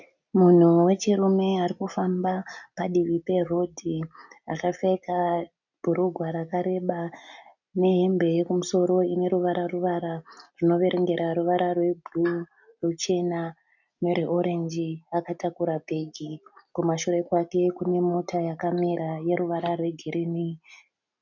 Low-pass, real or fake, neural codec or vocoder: 7.2 kHz; real; none